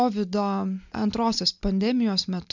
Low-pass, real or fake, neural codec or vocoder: 7.2 kHz; real; none